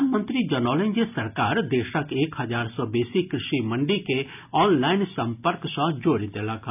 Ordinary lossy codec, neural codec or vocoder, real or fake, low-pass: none; none; real; 3.6 kHz